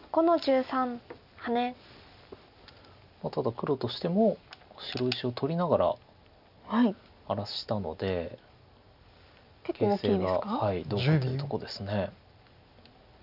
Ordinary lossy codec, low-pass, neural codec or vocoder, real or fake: none; 5.4 kHz; none; real